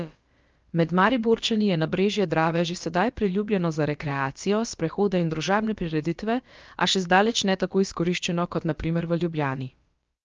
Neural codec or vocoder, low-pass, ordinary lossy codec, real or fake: codec, 16 kHz, about 1 kbps, DyCAST, with the encoder's durations; 7.2 kHz; Opus, 24 kbps; fake